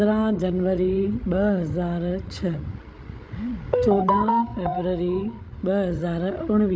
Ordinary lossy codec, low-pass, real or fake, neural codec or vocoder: none; none; fake; codec, 16 kHz, 16 kbps, FreqCodec, larger model